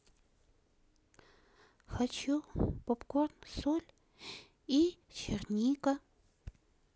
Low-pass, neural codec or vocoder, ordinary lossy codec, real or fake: none; none; none; real